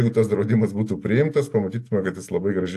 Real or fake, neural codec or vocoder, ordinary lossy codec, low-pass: real; none; AAC, 64 kbps; 14.4 kHz